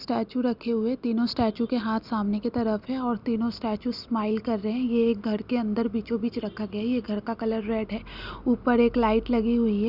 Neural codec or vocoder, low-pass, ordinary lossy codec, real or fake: none; 5.4 kHz; Opus, 64 kbps; real